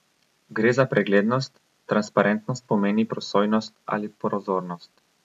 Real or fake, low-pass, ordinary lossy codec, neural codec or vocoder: real; 14.4 kHz; none; none